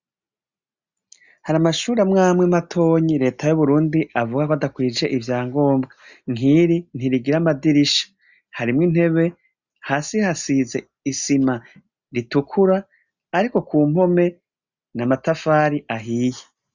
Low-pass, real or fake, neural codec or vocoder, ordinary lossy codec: 7.2 kHz; real; none; Opus, 64 kbps